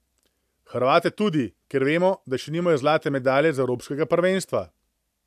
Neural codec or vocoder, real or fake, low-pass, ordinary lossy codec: none; real; 14.4 kHz; AAC, 96 kbps